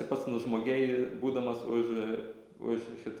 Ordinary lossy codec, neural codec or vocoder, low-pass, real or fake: Opus, 32 kbps; none; 19.8 kHz; real